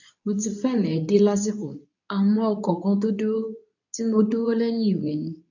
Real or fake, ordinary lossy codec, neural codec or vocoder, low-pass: fake; none; codec, 24 kHz, 0.9 kbps, WavTokenizer, medium speech release version 2; 7.2 kHz